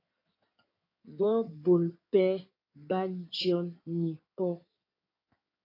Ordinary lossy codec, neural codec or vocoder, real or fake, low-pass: AAC, 32 kbps; codec, 16 kHz in and 24 kHz out, 2.2 kbps, FireRedTTS-2 codec; fake; 5.4 kHz